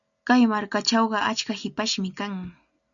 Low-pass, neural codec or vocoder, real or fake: 7.2 kHz; none; real